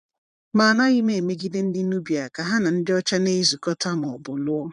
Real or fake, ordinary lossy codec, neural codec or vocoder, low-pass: fake; none; vocoder, 22.05 kHz, 80 mel bands, Vocos; 9.9 kHz